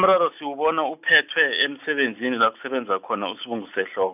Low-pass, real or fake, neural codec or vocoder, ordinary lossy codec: 3.6 kHz; real; none; none